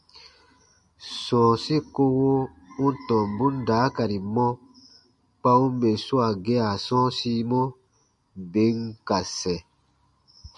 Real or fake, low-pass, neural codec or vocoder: real; 10.8 kHz; none